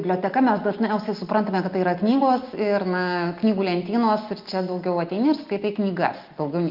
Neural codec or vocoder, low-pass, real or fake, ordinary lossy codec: none; 5.4 kHz; real; Opus, 24 kbps